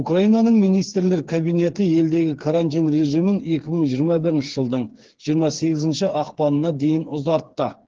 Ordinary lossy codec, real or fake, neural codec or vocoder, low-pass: Opus, 16 kbps; fake; codec, 16 kHz, 4 kbps, FreqCodec, smaller model; 7.2 kHz